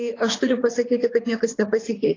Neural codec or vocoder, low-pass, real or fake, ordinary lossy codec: codec, 24 kHz, 6 kbps, HILCodec; 7.2 kHz; fake; AAC, 32 kbps